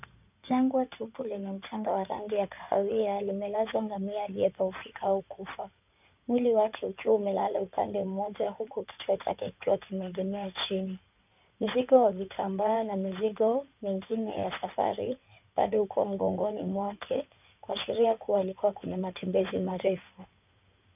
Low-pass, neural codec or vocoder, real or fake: 3.6 kHz; codec, 16 kHz in and 24 kHz out, 2.2 kbps, FireRedTTS-2 codec; fake